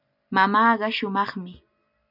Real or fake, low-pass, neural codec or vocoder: real; 5.4 kHz; none